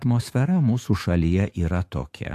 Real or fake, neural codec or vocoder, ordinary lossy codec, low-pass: fake; autoencoder, 48 kHz, 128 numbers a frame, DAC-VAE, trained on Japanese speech; AAC, 64 kbps; 14.4 kHz